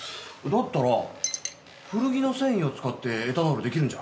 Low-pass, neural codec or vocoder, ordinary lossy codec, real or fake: none; none; none; real